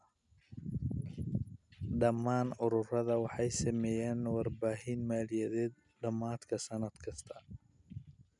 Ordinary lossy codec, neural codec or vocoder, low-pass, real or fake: none; none; 10.8 kHz; real